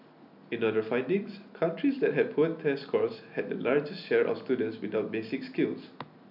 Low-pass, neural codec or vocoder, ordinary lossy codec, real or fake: 5.4 kHz; none; none; real